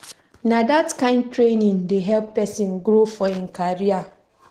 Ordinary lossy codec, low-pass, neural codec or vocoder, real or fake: Opus, 16 kbps; 14.4 kHz; none; real